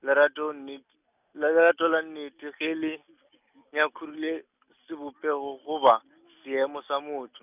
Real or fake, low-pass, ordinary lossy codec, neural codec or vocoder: real; 3.6 kHz; none; none